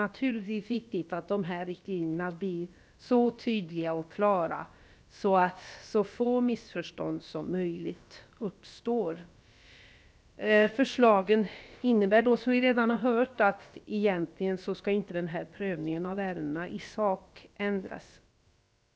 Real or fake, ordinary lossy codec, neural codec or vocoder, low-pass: fake; none; codec, 16 kHz, about 1 kbps, DyCAST, with the encoder's durations; none